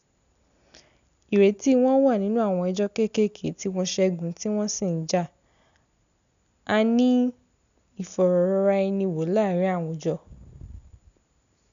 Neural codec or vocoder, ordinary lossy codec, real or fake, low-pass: none; none; real; 7.2 kHz